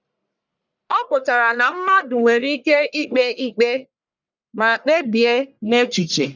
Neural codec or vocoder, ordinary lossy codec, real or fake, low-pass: codec, 44.1 kHz, 1.7 kbps, Pupu-Codec; none; fake; 7.2 kHz